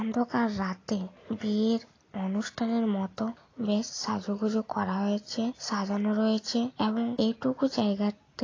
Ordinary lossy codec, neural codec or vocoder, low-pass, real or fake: AAC, 32 kbps; none; 7.2 kHz; real